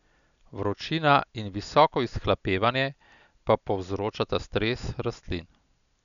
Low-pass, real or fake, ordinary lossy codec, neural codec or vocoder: 7.2 kHz; real; none; none